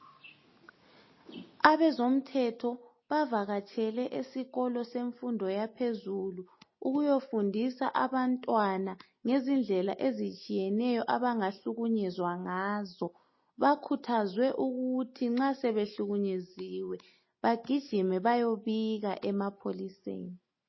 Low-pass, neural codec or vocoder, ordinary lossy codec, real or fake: 7.2 kHz; none; MP3, 24 kbps; real